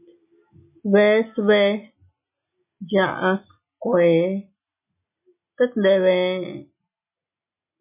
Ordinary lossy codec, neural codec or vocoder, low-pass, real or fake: MP3, 32 kbps; none; 3.6 kHz; real